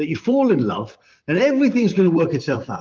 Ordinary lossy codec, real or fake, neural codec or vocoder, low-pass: Opus, 32 kbps; fake; vocoder, 44.1 kHz, 128 mel bands, Pupu-Vocoder; 7.2 kHz